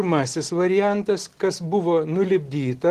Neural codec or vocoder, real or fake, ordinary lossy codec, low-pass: none; real; Opus, 16 kbps; 14.4 kHz